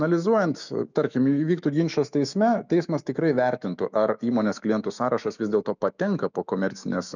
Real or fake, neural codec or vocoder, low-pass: fake; autoencoder, 48 kHz, 128 numbers a frame, DAC-VAE, trained on Japanese speech; 7.2 kHz